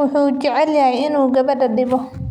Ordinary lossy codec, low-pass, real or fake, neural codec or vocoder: none; 19.8 kHz; real; none